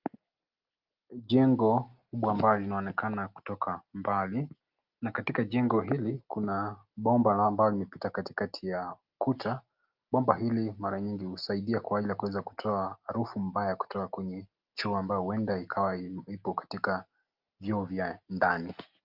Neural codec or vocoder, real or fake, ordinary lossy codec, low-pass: none; real; Opus, 24 kbps; 5.4 kHz